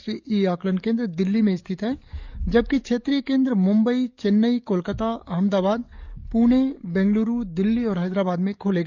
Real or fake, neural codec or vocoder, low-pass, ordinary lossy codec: fake; codec, 44.1 kHz, 7.8 kbps, DAC; 7.2 kHz; none